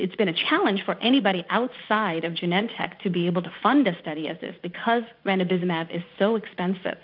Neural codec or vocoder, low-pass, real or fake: vocoder, 44.1 kHz, 80 mel bands, Vocos; 5.4 kHz; fake